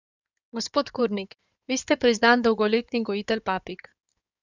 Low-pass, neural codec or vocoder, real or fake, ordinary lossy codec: 7.2 kHz; codec, 16 kHz in and 24 kHz out, 2.2 kbps, FireRedTTS-2 codec; fake; none